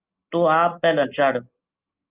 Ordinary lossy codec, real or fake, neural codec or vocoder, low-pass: Opus, 32 kbps; real; none; 3.6 kHz